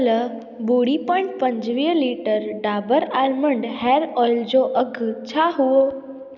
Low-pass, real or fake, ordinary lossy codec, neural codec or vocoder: 7.2 kHz; real; none; none